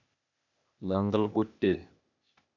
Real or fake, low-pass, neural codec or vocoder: fake; 7.2 kHz; codec, 16 kHz, 0.8 kbps, ZipCodec